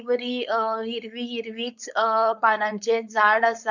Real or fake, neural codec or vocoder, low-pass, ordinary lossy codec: fake; codec, 16 kHz, 4.8 kbps, FACodec; 7.2 kHz; none